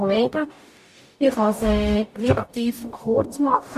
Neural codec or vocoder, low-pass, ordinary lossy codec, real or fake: codec, 44.1 kHz, 0.9 kbps, DAC; 14.4 kHz; AAC, 96 kbps; fake